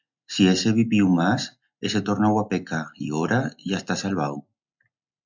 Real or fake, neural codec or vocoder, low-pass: real; none; 7.2 kHz